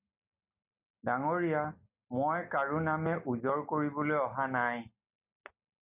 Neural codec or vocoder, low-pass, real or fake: none; 3.6 kHz; real